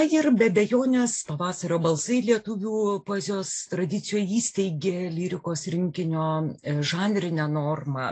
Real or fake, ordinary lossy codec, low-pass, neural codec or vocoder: real; AAC, 48 kbps; 9.9 kHz; none